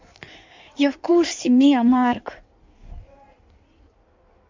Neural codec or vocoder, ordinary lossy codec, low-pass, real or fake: codec, 16 kHz in and 24 kHz out, 1.1 kbps, FireRedTTS-2 codec; MP3, 64 kbps; 7.2 kHz; fake